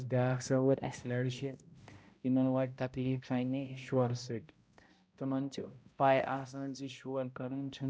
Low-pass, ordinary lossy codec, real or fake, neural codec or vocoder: none; none; fake; codec, 16 kHz, 0.5 kbps, X-Codec, HuBERT features, trained on balanced general audio